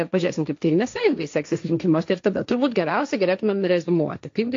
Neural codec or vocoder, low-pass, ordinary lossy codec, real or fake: codec, 16 kHz, 1.1 kbps, Voila-Tokenizer; 7.2 kHz; AAC, 48 kbps; fake